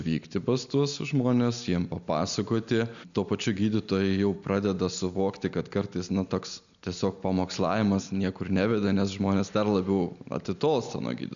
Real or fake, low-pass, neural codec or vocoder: real; 7.2 kHz; none